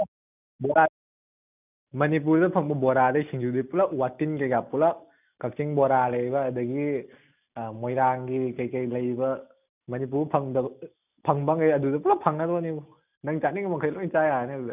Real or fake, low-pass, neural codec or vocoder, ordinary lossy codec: real; 3.6 kHz; none; none